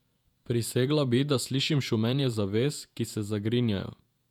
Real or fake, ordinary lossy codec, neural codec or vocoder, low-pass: fake; none; vocoder, 48 kHz, 128 mel bands, Vocos; 19.8 kHz